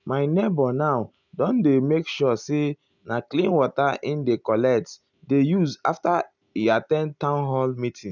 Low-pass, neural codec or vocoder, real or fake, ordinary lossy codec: 7.2 kHz; none; real; none